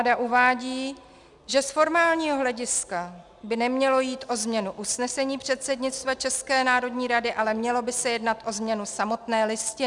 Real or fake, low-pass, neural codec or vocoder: real; 10.8 kHz; none